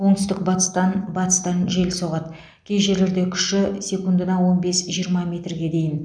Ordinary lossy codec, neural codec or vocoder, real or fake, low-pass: none; none; real; 9.9 kHz